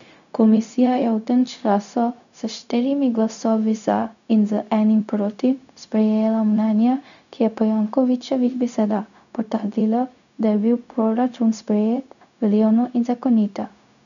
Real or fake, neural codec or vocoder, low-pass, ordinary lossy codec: fake; codec, 16 kHz, 0.4 kbps, LongCat-Audio-Codec; 7.2 kHz; none